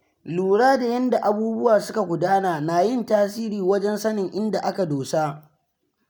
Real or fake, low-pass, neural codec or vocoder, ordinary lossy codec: real; none; none; none